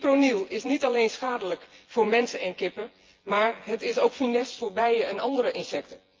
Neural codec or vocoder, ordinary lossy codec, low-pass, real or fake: vocoder, 24 kHz, 100 mel bands, Vocos; Opus, 32 kbps; 7.2 kHz; fake